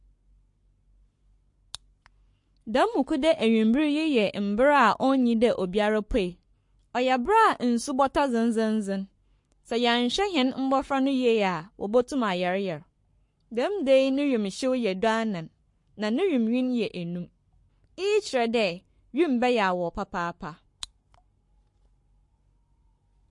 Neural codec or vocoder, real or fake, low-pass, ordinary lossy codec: codec, 44.1 kHz, 7.8 kbps, Pupu-Codec; fake; 10.8 kHz; MP3, 48 kbps